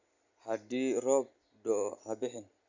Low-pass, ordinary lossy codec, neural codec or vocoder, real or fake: 7.2 kHz; Opus, 64 kbps; none; real